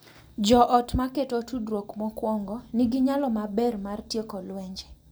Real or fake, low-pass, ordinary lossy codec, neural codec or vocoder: real; none; none; none